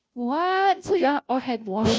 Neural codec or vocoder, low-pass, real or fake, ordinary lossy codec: codec, 16 kHz, 0.5 kbps, FunCodec, trained on Chinese and English, 25 frames a second; none; fake; none